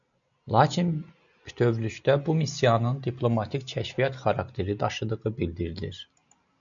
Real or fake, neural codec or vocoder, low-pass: real; none; 7.2 kHz